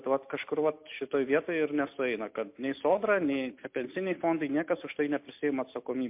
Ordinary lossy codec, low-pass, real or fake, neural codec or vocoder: MP3, 32 kbps; 3.6 kHz; real; none